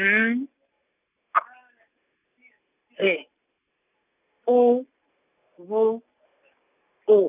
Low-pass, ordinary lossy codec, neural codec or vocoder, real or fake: 3.6 kHz; none; none; real